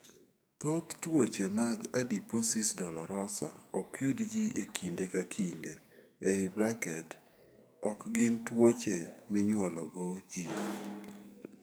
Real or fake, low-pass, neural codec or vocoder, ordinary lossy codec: fake; none; codec, 44.1 kHz, 2.6 kbps, SNAC; none